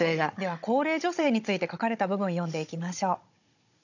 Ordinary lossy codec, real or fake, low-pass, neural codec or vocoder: none; fake; 7.2 kHz; codec, 16 kHz, 16 kbps, FunCodec, trained on Chinese and English, 50 frames a second